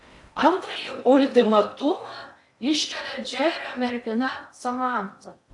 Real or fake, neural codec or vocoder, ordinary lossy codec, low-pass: fake; codec, 16 kHz in and 24 kHz out, 0.6 kbps, FocalCodec, streaming, 4096 codes; AAC, 64 kbps; 10.8 kHz